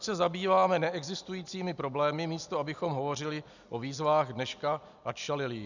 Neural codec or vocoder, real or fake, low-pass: none; real; 7.2 kHz